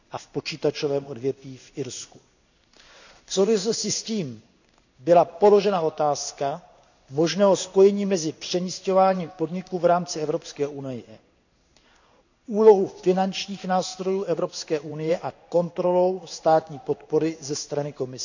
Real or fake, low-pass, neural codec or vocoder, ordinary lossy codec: fake; 7.2 kHz; codec, 16 kHz in and 24 kHz out, 1 kbps, XY-Tokenizer; none